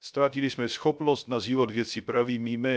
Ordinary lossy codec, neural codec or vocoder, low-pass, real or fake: none; codec, 16 kHz, 0.3 kbps, FocalCodec; none; fake